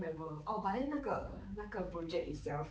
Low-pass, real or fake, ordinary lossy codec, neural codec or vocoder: none; fake; none; codec, 16 kHz, 4 kbps, X-Codec, HuBERT features, trained on balanced general audio